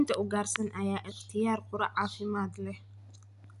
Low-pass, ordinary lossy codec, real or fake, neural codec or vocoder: 10.8 kHz; none; real; none